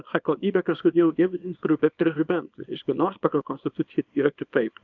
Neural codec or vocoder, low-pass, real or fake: codec, 24 kHz, 0.9 kbps, WavTokenizer, small release; 7.2 kHz; fake